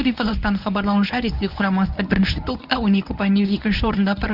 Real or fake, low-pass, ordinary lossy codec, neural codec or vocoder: fake; 5.4 kHz; none; codec, 24 kHz, 0.9 kbps, WavTokenizer, medium speech release version 1